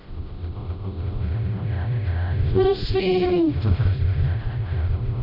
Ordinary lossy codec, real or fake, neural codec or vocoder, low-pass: none; fake; codec, 16 kHz, 0.5 kbps, FreqCodec, smaller model; 5.4 kHz